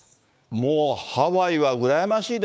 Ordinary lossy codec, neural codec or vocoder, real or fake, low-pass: none; codec, 16 kHz, 6 kbps, DAC; fake; none